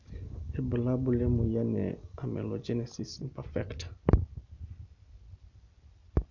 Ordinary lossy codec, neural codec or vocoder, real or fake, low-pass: none; none; real; 7.2 kHz